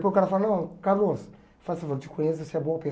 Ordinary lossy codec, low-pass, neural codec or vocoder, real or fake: none; none; none; real